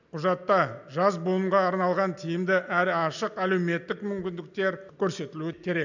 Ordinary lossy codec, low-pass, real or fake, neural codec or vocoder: none; 7.2 kHz; real; none